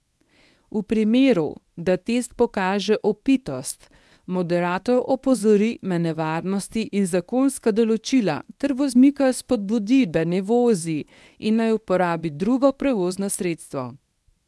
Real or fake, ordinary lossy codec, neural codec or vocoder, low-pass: fake; none; codec, 24 kHz, 0.9 kbps, WavTokenizer, medium speech release version 1; none